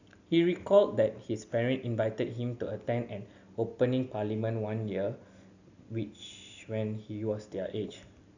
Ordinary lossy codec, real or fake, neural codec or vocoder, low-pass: none; real; none; 7.2 kHz